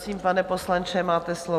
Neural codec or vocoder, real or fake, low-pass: none; real; 14.4 kHz